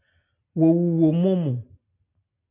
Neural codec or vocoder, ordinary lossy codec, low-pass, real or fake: none; MP3, 32 kbps; 3.6 kHz; real